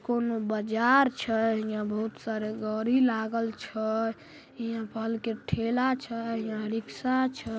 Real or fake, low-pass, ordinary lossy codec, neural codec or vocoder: real; none; none; none